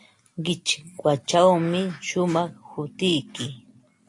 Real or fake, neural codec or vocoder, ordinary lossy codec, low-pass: real; none; AAC, 48 kbps; 10.8 kHz